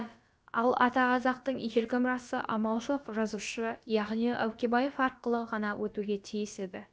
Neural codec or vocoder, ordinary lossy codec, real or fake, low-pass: codec, 16 kHz, about 1 kbps, DyCAST, with the encoder's durations; none; fake; none